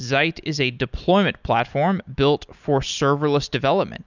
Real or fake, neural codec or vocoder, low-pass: real; none; 7.2 kHz